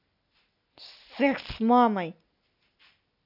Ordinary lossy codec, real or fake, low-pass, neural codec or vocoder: none; real; 5.4 kHz; none